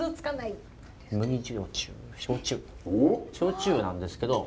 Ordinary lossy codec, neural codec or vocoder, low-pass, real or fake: none; none; none; real